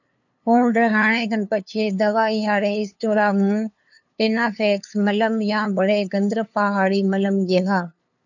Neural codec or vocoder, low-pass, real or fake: codec, 16 kHz, 2 kbps, FunCodec, trained on LibriTTS, 25 frames a second; 7.2 kHz; fake